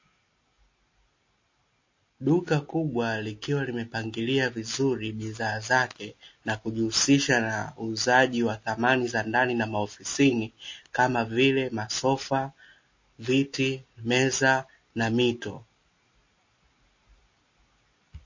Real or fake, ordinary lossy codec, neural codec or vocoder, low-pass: real; MP3, 32 kbps; none; 7.2 kHz